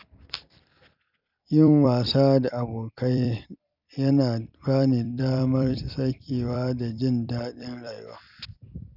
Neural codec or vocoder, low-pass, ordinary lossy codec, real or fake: vocoder, 44.1 kHz, 128 mel bands every 256 samples, BigVGAN v2; 5.4 kHz; none; fake